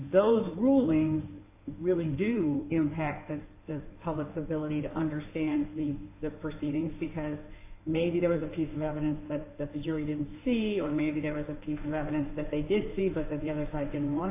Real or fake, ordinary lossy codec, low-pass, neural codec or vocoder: fake; AAC, 24 kbps; 3.6 kHz; codec, 16 kHz in and 24 kHz out, 2.2 kbps, FireRedTTS-2 codec